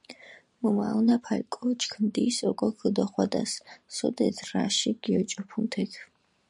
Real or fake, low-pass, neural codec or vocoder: fake; 10.8 kHz; vocoder, 44.1 kHz, 128 mel bands every 512 samples, BigVGAN v2